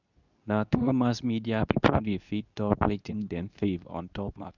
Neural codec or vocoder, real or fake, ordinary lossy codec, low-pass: codec, 24 kHz, 0.9 kbps, WavTokenizer, medium speech release version 2; fake; none; 7.2 kHz